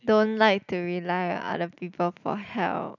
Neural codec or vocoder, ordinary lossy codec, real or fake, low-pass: none; none; real; 7.2 kHz